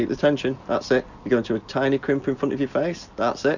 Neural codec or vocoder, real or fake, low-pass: none; real; 7.2 kHz